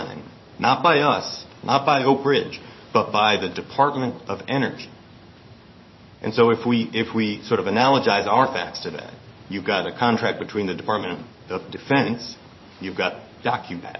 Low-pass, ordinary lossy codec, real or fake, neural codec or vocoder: 7.2 kHz; MP3, 24 kbps; fake; codec, 16 kHz in and 24 kHz out, 1 kbps, XY-Tokenizer